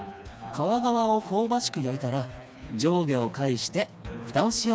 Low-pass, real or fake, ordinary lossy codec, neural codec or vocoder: none; fake; none; codec, 16 kHz, 2 kbps, FreqCodec, smaller model